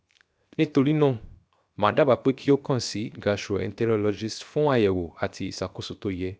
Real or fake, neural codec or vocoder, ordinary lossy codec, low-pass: fake; codec, 16 kHz, 0.7 kbps, FocalCodec; none; none